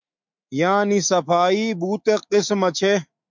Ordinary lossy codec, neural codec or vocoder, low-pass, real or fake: MP3, 64 kbps; autoencoder, 48 kHz, 128 numbers a frame, DAC-VAE, trained on Japanese speech; 7.2 kHz; fake